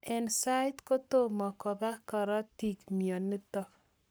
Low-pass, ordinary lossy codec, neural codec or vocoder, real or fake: none; none; codec, 44.1 kHz, 7.8 kbps, Pupu-Codec; fake